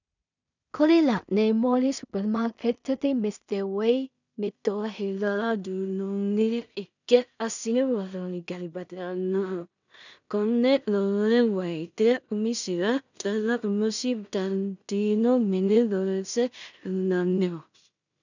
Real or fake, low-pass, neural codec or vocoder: fake; 7.2 kHz; codec, 16 kHz in and 24 kHz out, 0.4 kbps, LongCat-Audio-Codec, two codebook decoder